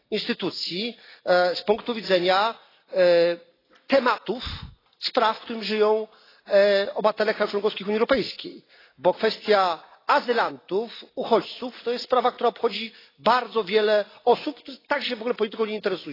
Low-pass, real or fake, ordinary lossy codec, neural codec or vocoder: 5.4 kHz; real; AAC, 24 kbps; none